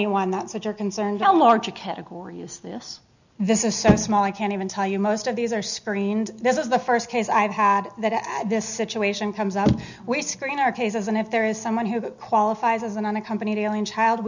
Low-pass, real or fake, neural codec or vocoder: 7.2 kHz; real; none